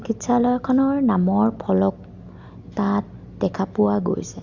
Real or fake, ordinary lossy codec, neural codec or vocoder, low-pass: real; Opus, 64 kbps; none; 7.2 kHz